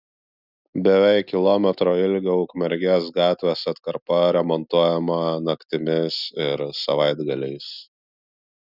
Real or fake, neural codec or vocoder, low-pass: real; none; 5.4 kHz